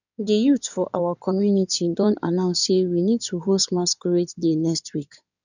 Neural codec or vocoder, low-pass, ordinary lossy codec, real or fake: codec, 16 kHz in and 24 kHz out, 2.2 kbps, FireRedTTS-2 codec; 7.2 kHz; none; fake